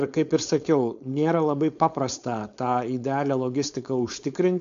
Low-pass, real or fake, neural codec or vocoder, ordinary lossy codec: 7.2 kHz; fake; codec, 16 kHz, 4.8 kbps, FACodec; AAC, 64 kbps